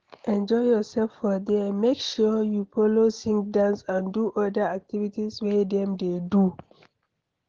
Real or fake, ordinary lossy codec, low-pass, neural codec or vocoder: real; Opus, 16 kbps; 7.2 kHz; none